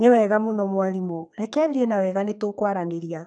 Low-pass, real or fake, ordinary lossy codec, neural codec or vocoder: 10.8 kHz; fake; none; codec, 32 kHz, 1.9 kbps, SNAC